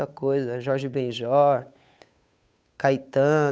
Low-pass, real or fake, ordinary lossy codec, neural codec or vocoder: none; fake; none; codec, 16 kHz, 8 kbps, FunCodec, trained on Chinese and English, 25 frames a second